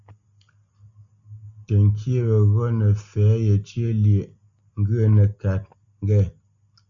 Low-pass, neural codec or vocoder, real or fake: 7.2 kHz; none; real